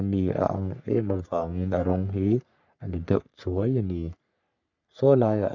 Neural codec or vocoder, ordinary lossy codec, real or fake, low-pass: codec, 44.1 kHz, 3.4 kbps, Pupu-Codec; none; fake; 7.2 kHz